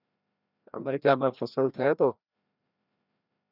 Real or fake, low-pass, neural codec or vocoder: fake; 5.4 kHz; codec, 16 kHz, 1 kbps, FreqCodec, larger model